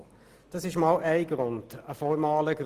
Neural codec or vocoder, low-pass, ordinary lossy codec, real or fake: none; 14.4 kHz; Opus, 24 kbps; real